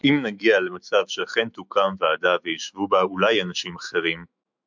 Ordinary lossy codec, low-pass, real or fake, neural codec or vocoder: MP3, 64 kbps; 7.2 kHz; fake; autoencoder, 48 kHz, 128 numbers a frame, DAC-VAE, trained on Japanese speech